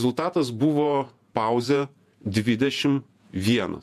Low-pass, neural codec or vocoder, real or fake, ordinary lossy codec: 14.4 kHz; vocoder, 48 kHz, 128 mel bands, Vocos; fake; AAC, 96 kbps